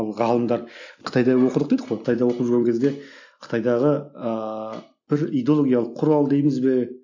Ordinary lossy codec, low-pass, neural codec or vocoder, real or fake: AAC, 48 kbps; 7.2 kHz; none; real